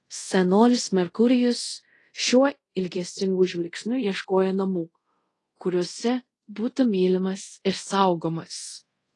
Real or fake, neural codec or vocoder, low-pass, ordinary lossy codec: fake; codec, 24 kHz, 0.5 kbps, DualCodec; 10.8 kHz; AAC, 32 kbps